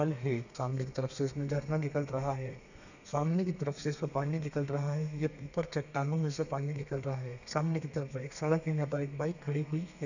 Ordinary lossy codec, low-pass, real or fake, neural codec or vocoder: none; 7.2 kHz; fake; codec, 32 kHz, 1.9 kbps, SNAC